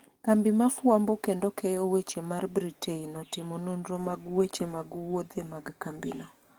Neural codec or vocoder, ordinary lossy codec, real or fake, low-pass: codec, 44.1 kHz, 7.8 kbps, DAC; Opus, 24 kbps; fake; 19.8 kHz